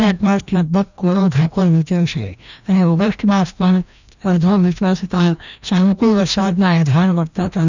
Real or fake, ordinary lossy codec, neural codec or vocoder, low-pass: fake; none; codec, 16 kHz, 1 kbps, FreqCodec, larger model; 7.2 kHz